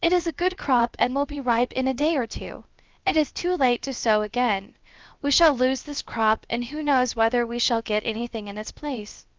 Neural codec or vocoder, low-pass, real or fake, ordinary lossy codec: codec, 16 kHz, 0.7 kbps, FocalCodec; 7.2 kHz; fake; Opus, 24 kbps